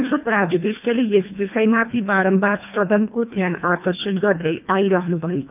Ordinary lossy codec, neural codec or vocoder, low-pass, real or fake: none; codec, 24 kHz, 1.5 kbps, HILCodec; 3.6 kHz; fake